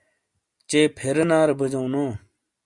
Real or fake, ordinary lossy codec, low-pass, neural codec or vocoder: real; Opus, 64 kbps; 10.8 kHz; none